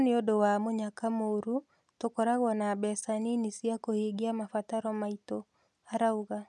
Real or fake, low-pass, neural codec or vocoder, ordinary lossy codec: real; none; none; none